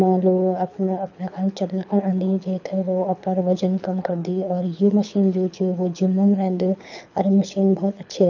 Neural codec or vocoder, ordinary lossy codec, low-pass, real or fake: codec, 24 kHz, 6 kbps, HILCodec; none; 7.2 kHz; fake